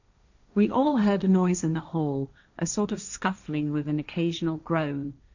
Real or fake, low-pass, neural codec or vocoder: fake; 7.2 kHz; codec, 16 kHz, 1.1 kbps, Voila-Tokenizer